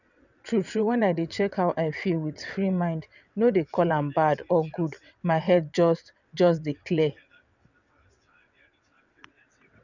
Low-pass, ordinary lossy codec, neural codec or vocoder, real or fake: 7.2 kHz; none; vocoder, 22.05 kHz, 80 mel bands, WaveNeXt; fake